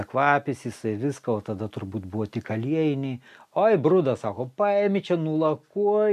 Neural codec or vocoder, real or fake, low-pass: none; real; 14.4 kHz